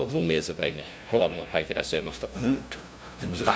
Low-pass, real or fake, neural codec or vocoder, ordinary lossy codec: none; fake; codec, 16 kHz, 0.5 kbps, FunCodec, trained on LibriTTS, 25 frames a second; none